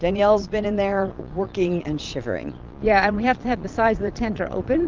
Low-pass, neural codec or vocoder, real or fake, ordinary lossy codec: 7.2 kHz; vocoder, 22.05 kHz, 80 mel bands, Vocos; fake; Opus, 24 kbps